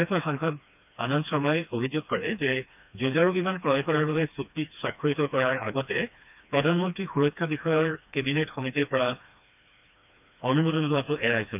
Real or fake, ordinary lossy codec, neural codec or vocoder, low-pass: fake; none; codec, 16 kHz, 2 kbps, FreqCodec, smaller model; 3.6 kHz